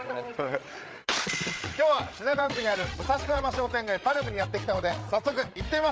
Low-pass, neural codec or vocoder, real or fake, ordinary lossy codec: none; codec, 16 kHz, 8 kbps, FreqCodec, larger model; fake; none